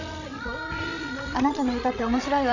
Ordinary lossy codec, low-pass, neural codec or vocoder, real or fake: none; 7.2 kHz; codec, 16 kHz, 16 kbps, FreqCodec, larger model; fake